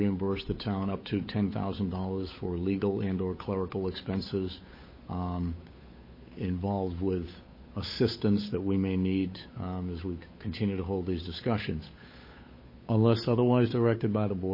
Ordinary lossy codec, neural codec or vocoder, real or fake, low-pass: MP3, 24 kbps; codec, 16 kHz, 8 kbps, FunCodec, trained on Chinese and English, 25 frames a second; fake; 5.4 kHz